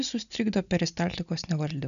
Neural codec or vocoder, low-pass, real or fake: none; 7.2 kHz; real